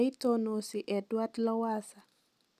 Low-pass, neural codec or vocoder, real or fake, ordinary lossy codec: 14.4 kHz; none; real; none